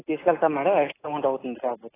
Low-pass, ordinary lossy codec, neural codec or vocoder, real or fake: 3.6 kHz; AAC, 16 kbps; none; real